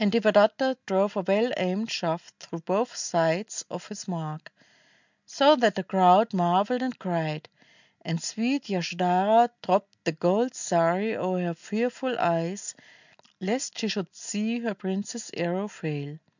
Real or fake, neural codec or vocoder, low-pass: real; none; 7.2 kHz